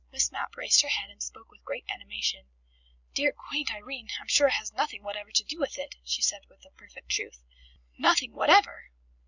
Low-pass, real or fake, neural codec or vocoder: 7.2 kHz; real; none